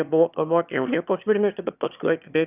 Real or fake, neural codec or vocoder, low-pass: fake; autoencoder, 22.05 kHz, a latent of 192 numbers a frame, VITS, trained on one speaker; 3.6 kHz